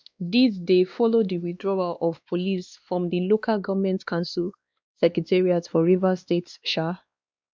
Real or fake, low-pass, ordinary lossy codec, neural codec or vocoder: fake; none; none; codec, 16 kHz, 2 kbps, X-Codec, WavLM features, trained on Multilingual LibriSpeech